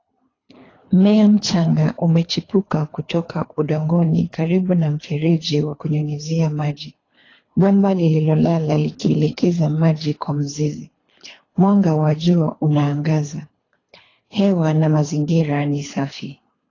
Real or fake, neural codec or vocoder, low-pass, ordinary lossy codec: fake; codec, 24 kHz, 3 kbps, HILCodec; 7.2 kHz; AAC, 32 kbps